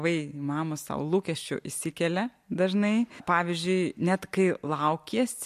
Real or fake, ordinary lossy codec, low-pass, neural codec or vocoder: real; MP3, 64 kbps; 14.4 kHz; none